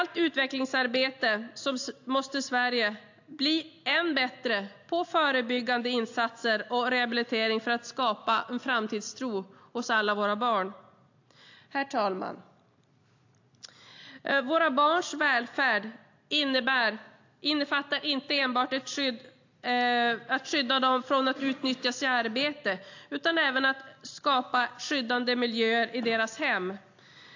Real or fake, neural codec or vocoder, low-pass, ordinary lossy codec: real; none; 7.2 kHz; AAC, 48 kbps